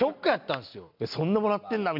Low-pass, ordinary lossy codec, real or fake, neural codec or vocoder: 5.4 kHz; none; real; none